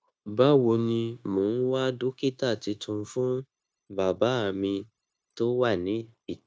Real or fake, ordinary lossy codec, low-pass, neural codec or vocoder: fake; none; none; codec, 16 kHz, 0.9 kbps, LongCat-Audio-Codec